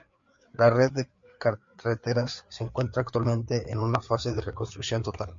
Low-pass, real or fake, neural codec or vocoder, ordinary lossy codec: 7.2 kHz; fake; codec, 16 kHz, 4 kbps, FreqCodec, larger model; MP3, 48 kbps